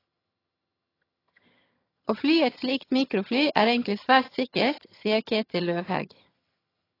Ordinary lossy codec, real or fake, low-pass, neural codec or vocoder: AAC, 24 kbps; fake; 5.4 kHz; vocoder, 22.05 kHz, 80 mel bands, HiFi-GAN